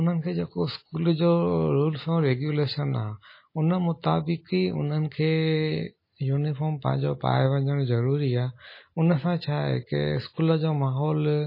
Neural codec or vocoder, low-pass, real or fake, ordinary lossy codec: none; 5.4 kHz; real; MP3, 24 kbps